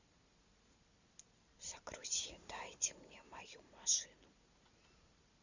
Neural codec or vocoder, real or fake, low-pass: none; real; 7.2 kHz